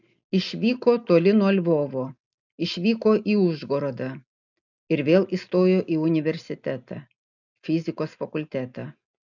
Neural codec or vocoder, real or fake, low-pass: none; real; 7.2 kHz